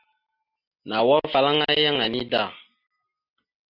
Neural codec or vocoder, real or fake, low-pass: none; real; 5.4 kHz